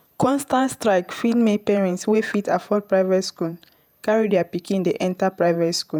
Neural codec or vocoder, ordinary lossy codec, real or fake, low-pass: vocoder, 48 kHz, 128 mel bands, Vocos; none; fake; none